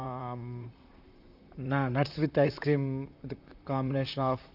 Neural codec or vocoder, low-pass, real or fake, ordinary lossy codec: vocoder, 44.1 kHz, 128 mel bands, Pupu-Vocoder; 5.4 kHz; fake; none